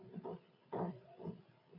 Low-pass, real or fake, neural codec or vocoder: 5.4 kHz; fake; codec, 16 kHz, 8 kbps, FreqCodec, larger model